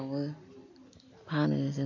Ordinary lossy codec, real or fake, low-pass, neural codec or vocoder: MP3, 48 kbps; real; 7.2 kHz; none